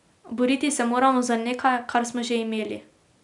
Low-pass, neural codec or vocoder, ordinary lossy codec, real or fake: 10.8 kHz; none; none; real